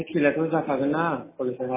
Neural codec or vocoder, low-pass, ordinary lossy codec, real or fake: none; 3.6 kHz; MP3, 16 kbps; real